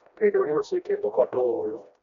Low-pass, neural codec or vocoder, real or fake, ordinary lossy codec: 7.2 kHz; codec, 16 kHz, 1 kbps, FreqCodec, smaller model; fake; none